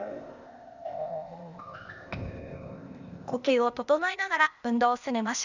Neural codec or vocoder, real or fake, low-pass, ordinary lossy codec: codec, 16 kHz, 0.8 kbps, ZipCodec; fake; 7.2 kHz; none